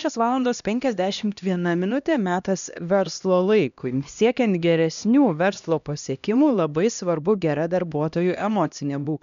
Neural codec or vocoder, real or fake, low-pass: codec, 16 kHz, 1 kbps, X-Codec, HuBERT features, trained on LibriSpeech; fake; 7.2 kHz